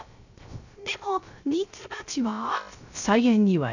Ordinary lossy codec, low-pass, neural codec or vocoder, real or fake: none; 7.2 kHz; codec, 16 kHz, 0.3 kbps, FocalCodec; fake